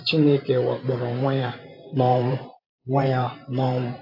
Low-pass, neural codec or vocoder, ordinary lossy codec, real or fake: 5.4 kHz; vocoder, 44.1 kHz, 128 mel bands every 512 samples, BigVGAN v2; AAC, 48 kbps; fake